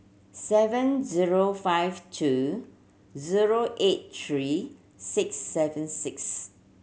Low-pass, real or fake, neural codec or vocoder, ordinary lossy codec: none; real; none; none